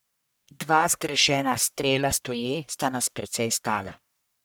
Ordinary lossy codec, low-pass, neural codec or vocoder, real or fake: none; none; codec, 44.1 kHz, 1.7 kbps, Pupu-Codec; fake